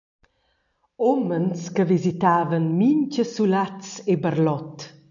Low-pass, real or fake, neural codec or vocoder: 7.2 kHz; real; none